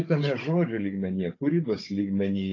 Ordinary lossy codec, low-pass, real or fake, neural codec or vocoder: AAC, 32 kbps; 7.2 kHz; fake; codec, 16 kHz, 16 kbps, FunCodec, trained on Chinese and English, 50 frames a second